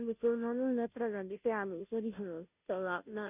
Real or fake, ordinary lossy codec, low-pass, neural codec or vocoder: fake; none; 3.6 kHz; codec, 16 kHz, 0.5 kbps, FunCodec, trained on Chinese and English, 25 frames a second